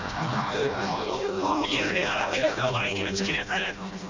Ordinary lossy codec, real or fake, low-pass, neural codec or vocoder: MP3, 64 kbps; fake; 7.2 kHz; codec, 16 kHz, 1 kbps, FreqCodec, smaller model